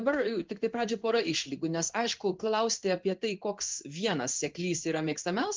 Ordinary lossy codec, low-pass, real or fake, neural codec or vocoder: Opus, 24 kbps; 7.2 kHz; fake; codec, 16 kHz in and 24 kHz out, 1 kbps, XY-Tokenizer